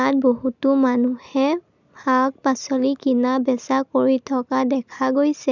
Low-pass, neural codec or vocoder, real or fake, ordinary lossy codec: 7.2 kHz; none; real; none